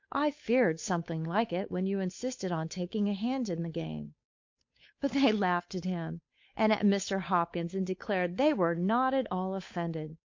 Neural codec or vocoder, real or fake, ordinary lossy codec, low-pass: codec, 16 kHz, 8 kbps, FunCodec, trained on Chinese and English, 25 frames a second; fake; MP3, 48 kbps; 7.2 kHz